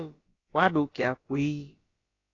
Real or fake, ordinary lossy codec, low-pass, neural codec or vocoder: fake; AAC, 32 kbps; 7.2 kHz; codec, 16 kHz, about 1 kbps, DyCAST, with the encoder's durations